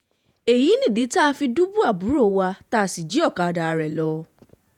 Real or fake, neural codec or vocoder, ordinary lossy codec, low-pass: fake; vocoder, 48 kHz, 128 mel bands, Vocos; none; 19.8 kHz